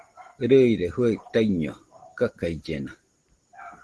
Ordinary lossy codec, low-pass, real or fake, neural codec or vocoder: Opus, 16 kbps; 10.8 kHz; real; none